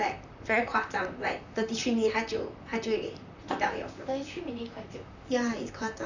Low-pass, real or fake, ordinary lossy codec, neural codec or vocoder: 7.2 kHz; fake; none; vocoder, 44.1 kHz, 128 mel bands, Pupu-Vocoder